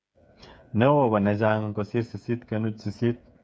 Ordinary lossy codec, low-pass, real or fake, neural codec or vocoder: none; none; fake; codec, 16 kHz, 8 kbps, FreqCodec, smaller model